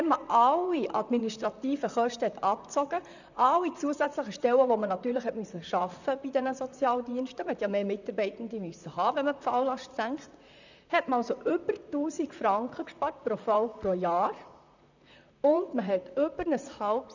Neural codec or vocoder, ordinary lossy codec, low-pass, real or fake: vocoder, 44.1 kHz, 128 mel bands, Pupu-Vocoder; none; 7.2 kHz; fake